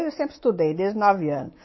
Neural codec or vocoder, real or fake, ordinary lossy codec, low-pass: codec, 24 kHz, 3.1 kbps, DualCodec; fake; MP3, 24 kbps; 7.2 kHz